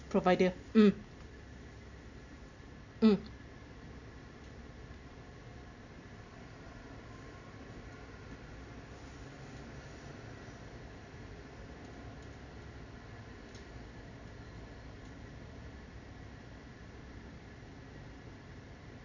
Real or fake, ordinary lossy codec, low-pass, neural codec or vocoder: real; none; 7.2 kHz; none